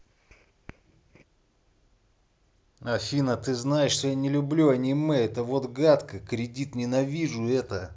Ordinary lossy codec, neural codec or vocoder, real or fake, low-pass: none; none; real; none